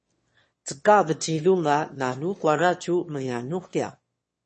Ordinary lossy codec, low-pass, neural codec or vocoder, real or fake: MP3, 32 kbps; 9.9 kHz; autoencoder, 22.05 kHz, a latent of 192 numbers a frame, VITS, trained on one speaker; fake